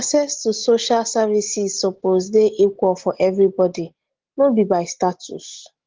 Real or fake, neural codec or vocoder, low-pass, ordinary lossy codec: real; none; 7.2 kHz; Opus, 16 kbps